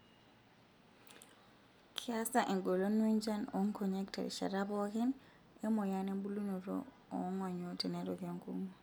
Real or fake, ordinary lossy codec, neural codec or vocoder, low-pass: real; none; none; none